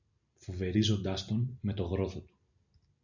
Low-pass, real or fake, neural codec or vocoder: 7.2 kHz; real; none